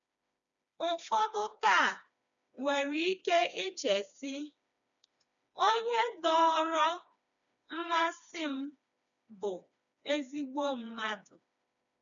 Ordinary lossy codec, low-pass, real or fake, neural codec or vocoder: none; 7.2 kHz; fake; codec, 16 kHz, 2 kbps, FreqCodec, smaller model